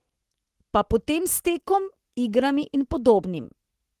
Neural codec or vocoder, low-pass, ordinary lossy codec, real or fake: codec, 44.1 kHz, 7.8 kbps, Pupu-Codec; 14.4 kHz; Opus, 16 kbps; fake